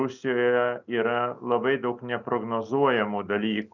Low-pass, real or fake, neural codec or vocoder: 7.2 kHz; real; none